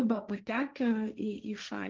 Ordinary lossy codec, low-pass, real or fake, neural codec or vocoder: Opus, 24 kbps; 7.2 kHz; fake; codec, 16 kHz, 1.1 kbps, Voila-Tokenizer